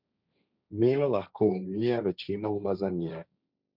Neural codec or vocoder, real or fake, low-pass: codec, 16 kHz, 1.1 kbps, Voila-Tokenizer; fake; 5.4 kHz